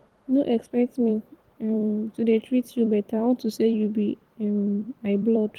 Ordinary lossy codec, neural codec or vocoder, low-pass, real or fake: Opus, 24 kbps; vocoder, 44.1 kHz, 128 mel bands every 256 samples, BigVGAN v2; 19.8 kHz; fake